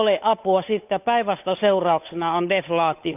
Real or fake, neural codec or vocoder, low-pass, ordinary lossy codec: fake; codec, 16 kHz, 8 kbps, FunCodec, trained on Chinese and English, 25 frames a second; 3.6 kHz; none